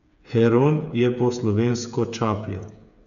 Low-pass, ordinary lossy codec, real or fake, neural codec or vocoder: 7.2 kHz; none; fake; codec, 16 kHz, 8 kbps, FreqCodec, smaller model